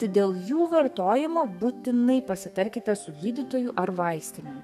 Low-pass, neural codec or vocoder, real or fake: 14.4 kHz; codec, 32 kHz, 1.9 kbps, SNAC; fake